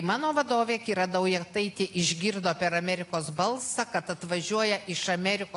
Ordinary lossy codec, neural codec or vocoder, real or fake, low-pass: AAC, 48 kbps; none; real; 10.8 kHz